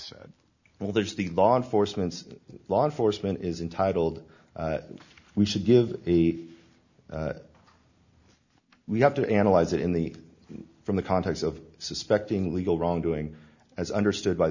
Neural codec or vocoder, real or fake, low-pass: none; real; 7.2 kHz